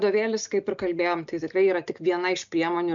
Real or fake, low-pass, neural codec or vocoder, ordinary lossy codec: real; 7.2 kHz; none; MP3, 64 kbps